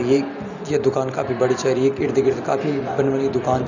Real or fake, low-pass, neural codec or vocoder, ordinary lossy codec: real; 7.2 kHz; none; none